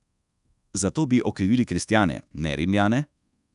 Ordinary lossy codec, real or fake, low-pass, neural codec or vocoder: none; fake; 10.8 kHz; codec, 24 kHz, 1.2 kbps, DualCodec